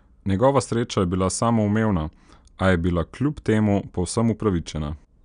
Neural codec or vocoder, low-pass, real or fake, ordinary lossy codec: none; 9.9 kHz; real; none